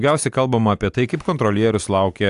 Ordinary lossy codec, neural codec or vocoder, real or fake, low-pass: AAC, 64 kbps; none; real; 10.8 kHz